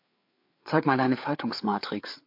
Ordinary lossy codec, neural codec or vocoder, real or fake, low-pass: MP3, 32 kbps; codec, 16 kHz, 4 kbps, FreqCodec, larger model; fake; 5.4 kHz